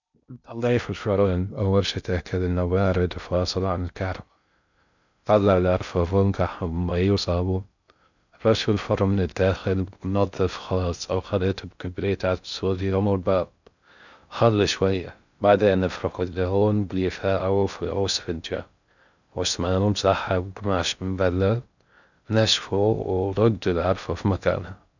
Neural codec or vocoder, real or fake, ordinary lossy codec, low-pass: codec, 16 kHz in and 24 kHz out, 0.6 kbps, FocalCodec, streaming, 2048 codes; fake; none; 7.2 kHz